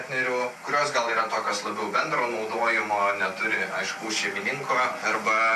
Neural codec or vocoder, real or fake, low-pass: none; real; 14.4 kHz